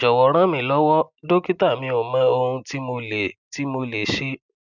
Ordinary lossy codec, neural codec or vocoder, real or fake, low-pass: none; none; real; 7.2 kHz